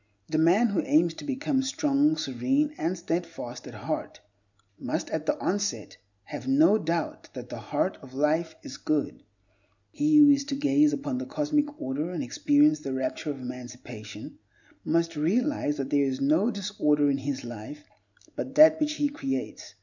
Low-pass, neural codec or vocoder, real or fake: 7.2 kHz; none; real